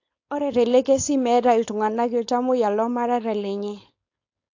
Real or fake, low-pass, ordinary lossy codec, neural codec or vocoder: fake; 7.2 kHz; AAC, 48 kbps; codec, 16 kHz, 4.8 kbps, FACodec